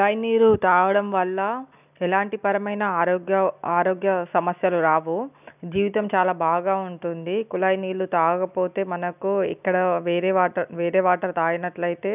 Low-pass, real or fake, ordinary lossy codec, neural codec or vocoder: 3.6 kHz; real; none; none